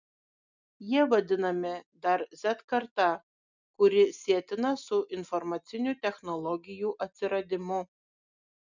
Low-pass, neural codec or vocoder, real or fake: 7.2 kHz; none; real